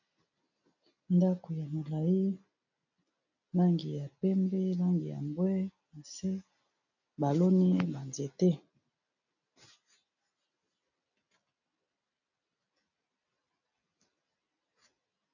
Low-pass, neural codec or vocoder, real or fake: 7.2 kHz; none; real